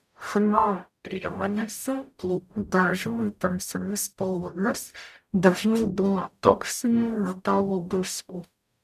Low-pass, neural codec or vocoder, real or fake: 14.4 kHz; codec, 44.1 kHz, 0.9 kbps, DAC; fake